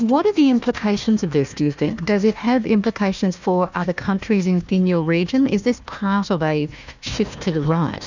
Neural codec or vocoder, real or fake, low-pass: codec, 16 kHz, 1 kbps, FunCodec, trained on Chinese and English, 50 frames a second; fake; 7.2 kHz